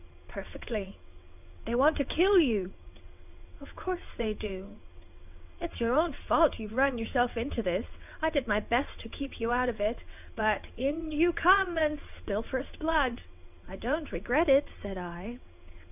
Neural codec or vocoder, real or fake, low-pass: vocoder, 22.05 kHz, 80 mel bands, WaveNeXt; fake; 3.6 kHz